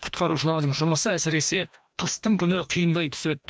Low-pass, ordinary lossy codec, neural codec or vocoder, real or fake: none; none; codec, 16 kHz, 1 kbps, FreqCodec, larger model; fake